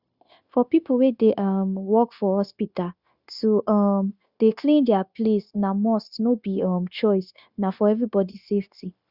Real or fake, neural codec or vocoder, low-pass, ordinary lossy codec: fake; codec, 16 kHz, 0.9 kbps, LongCat-Audio-Codec; 5.4 kHz; Opus, 64 kbps